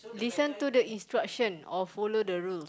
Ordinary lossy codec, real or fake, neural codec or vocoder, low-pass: none; real; none; none